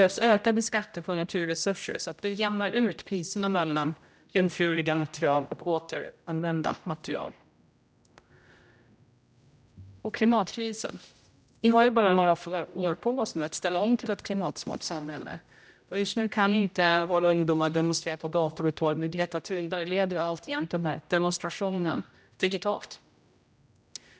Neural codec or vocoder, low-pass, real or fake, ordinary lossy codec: codec, 16 kHz, 0.5 kbps, X-Codec, HuBERT features, trained on general audio; none; fake; none